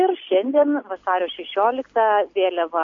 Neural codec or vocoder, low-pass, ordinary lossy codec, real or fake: none; 7.2 kHz; MP3, 48 kbps; real